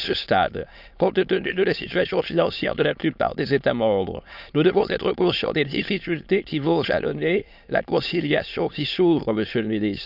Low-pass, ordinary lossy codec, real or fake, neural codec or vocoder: 5.4 kHz; none; fake; autoencoder, 22.05 kHz, a latent of 192 numbers a frame, VITS, trained on many speakers